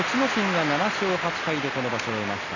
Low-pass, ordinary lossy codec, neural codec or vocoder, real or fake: 7.2 kHz; none; none; real